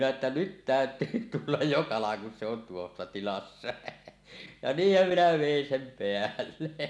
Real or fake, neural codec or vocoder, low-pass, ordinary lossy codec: real; none; none; none